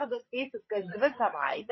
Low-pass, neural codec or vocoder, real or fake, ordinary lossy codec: 7.2 kHz; codec, 16 kHz, 16 kbps, FreqCodec, larger model; fake; MP3, 24 kbps